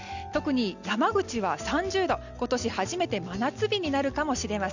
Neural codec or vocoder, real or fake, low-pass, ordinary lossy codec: none; real; 7.2 kHz; none